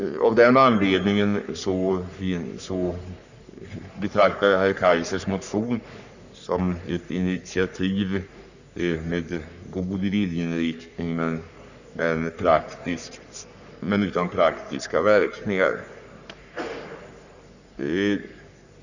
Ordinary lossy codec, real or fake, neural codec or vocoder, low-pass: none; fake; codec, 44.1 kHz, 3.4 kbps, Pupu-Codec; 7.2 kHz